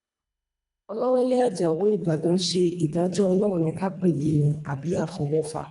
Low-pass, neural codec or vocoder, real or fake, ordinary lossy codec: 10.8 kHz; codec, 24 kHz, 1.5 kbps, HILCodec; fake; none